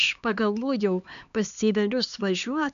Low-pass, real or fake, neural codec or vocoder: 7.2 kHz; fake; codec, 16 kHz, 2 kbps, X-Codec, HuBERT features, trained on LibriSpeech